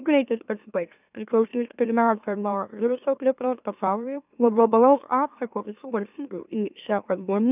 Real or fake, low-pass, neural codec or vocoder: fake; 3.6 kHz; autoencoder, 44.1 kHz, a latent of 192 numbers a frame, MeloTTS